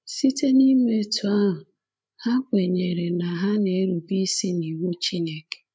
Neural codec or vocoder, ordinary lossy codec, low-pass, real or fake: codec, 16 kHz, 8 kbps, FreqCodec, larger model; none; none; fake